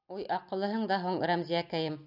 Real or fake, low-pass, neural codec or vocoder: real; 5.4 kHz; none